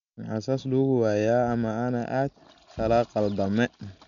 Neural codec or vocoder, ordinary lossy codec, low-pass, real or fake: none; none; 7.2 kHz; real